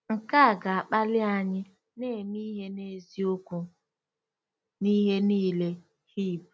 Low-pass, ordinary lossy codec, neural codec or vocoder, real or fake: none; none; none; real